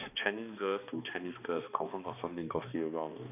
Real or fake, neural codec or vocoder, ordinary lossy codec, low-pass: fake; codec, 16 kHz, 2 kbps, X-Codec, HuBERT features, trained on balanced general audio; none; 3.6 kHz